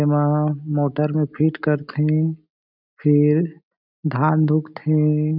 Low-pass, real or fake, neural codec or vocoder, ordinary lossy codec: 5.4 kHz; real; none; none